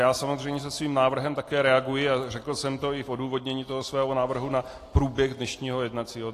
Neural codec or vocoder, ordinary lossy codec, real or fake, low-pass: none; AAC, 48 kbps; real; 14.4 kHz